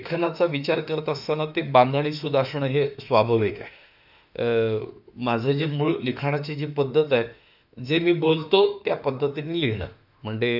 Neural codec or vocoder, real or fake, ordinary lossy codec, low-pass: autoencoder, 48 kHz, 32 numbers a frame, DAC-VAE, trained on Japanese speech; fake; none; 5.4 kHz